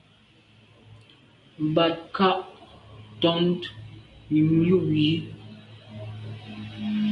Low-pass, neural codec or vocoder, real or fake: 10.8 kHz; none; real